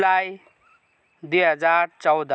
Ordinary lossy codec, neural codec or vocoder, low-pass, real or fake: none; none; none; real